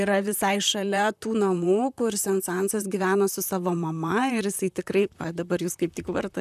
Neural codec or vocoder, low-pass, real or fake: vocoder, 44.1 kHz, 128 mel bands, Pupu-Vocoder; 14.4 kHz; fake